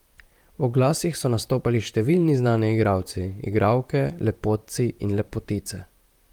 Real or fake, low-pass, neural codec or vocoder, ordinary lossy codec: fake; 19.8 kHz; vocoder, 44.1 kHz, 128 mel bands, Pupu-Vocoder; Opus, 32 kbps